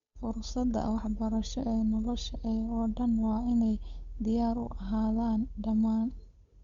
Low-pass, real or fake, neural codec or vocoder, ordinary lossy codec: 7.2 kHz; fake; codec, 16 kHz, 8 kbps, FunCodec, trained on Chinese and English, 25 frames a second; Opus, 64 kbps